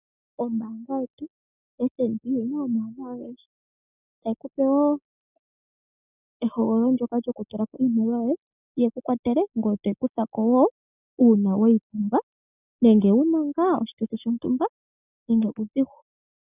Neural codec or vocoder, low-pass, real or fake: none; 3.6 kHz; real